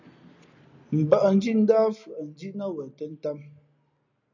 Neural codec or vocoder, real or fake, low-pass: none; real; 7.2 kHz